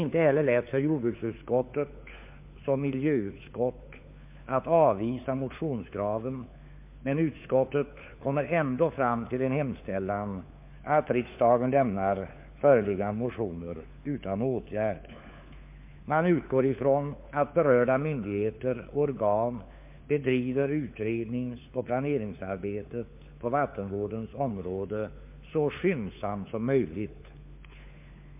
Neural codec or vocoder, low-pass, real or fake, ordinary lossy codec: codec, 16 kHz, 4 kbps, FunCodec, trained on LibriTTS, 50 frames a second; 3.6 kHz; fake; MP3, 32 kbps